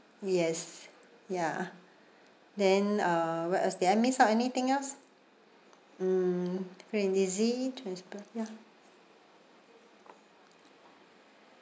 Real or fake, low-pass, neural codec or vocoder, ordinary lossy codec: real; none; none; none